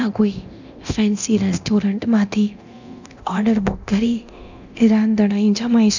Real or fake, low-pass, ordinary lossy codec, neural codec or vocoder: fake; 7.2 kHz; none; codec, 24 kHz, 0.9 kbps, DualCodec